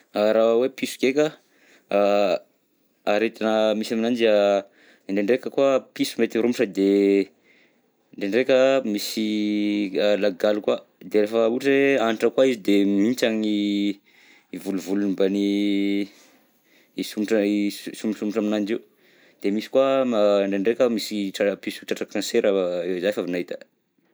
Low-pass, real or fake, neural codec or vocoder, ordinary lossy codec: none; real; none; none